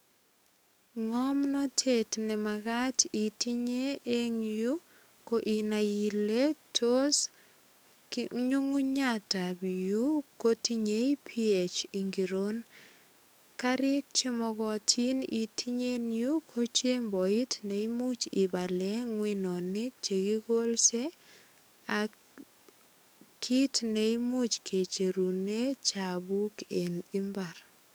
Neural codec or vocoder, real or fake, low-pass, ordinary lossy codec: codec, 44.1 kHz, 7.8 kbps, DAC; fake; none; none